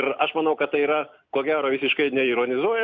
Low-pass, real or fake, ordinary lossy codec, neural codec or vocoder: 7.2 kHz; real; Opus, 64 kbps; none